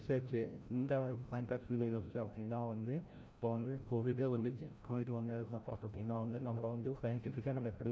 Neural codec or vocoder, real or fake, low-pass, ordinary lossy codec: codec, 16 kHz, 0.5 kbps, FreqCodec, larger model; fake; none; none